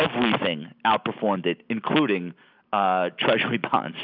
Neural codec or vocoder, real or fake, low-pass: none; real; 5.4 kHz